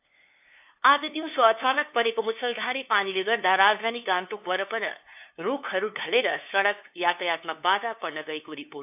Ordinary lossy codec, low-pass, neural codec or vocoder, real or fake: AAC, 32 kbps; 3.6 kHz; codec, 16 kHz, 2 kbps, FunCodec, trained on LibriTTS, 25 frames a second; fake